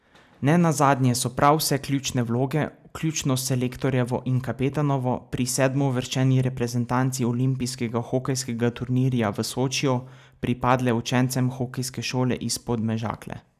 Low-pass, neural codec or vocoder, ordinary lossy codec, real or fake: 14.4 kHz; none; none; real